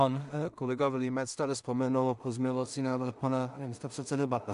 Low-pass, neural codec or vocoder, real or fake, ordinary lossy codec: 10.8 kHz; codec, 16 kHz in and 24 kHz out, 0.4 kbps, LongCat-Audio-Codec, two codebook decoder; fake; AAC, 96 kbps